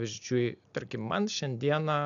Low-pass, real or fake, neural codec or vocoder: 7.2 kHz; real; none